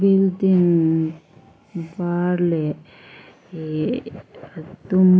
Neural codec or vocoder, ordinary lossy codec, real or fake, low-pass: none; none; real; none